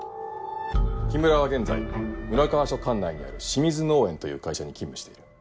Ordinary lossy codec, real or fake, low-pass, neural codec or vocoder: none; real; none; none